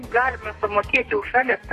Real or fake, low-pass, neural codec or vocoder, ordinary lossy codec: fake; 14.4 kHz; codec, 32 kHz, 1.9 kbps, SNAC; AAC, 48 kbps